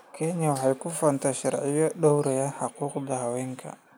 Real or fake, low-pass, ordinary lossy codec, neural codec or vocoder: real; none; none; none